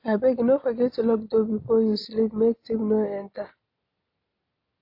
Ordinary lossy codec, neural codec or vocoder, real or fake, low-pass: AAC, 32 kbps; none; real; 5.4 kHz